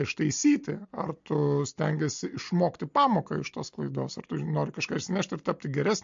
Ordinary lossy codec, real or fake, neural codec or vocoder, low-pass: MP3, 48 kbps; real; none; 7.2 kHz